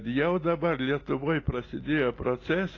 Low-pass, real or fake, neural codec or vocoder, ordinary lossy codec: 7.2 kHz; real; none; AAC, 32 kbps